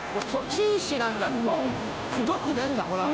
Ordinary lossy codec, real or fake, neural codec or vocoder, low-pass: none; fake; codec, 16 kHz, 0.5 kbps, FunCodec, trained on Chinese and English, 25 frames a second; none